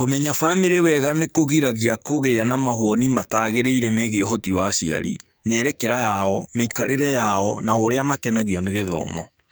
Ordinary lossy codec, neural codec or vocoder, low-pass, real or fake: none; codec, 44.1 kHz, 2.6 kbps, SNAC; none; fake